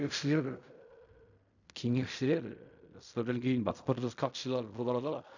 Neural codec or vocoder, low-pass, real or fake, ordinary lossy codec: codec, 16 kHz in and 24 kHz out, 0.4 kbps, LongCat-Audio-Codec, fine tuned four codebook decoder; 7.2 kHz; fake; none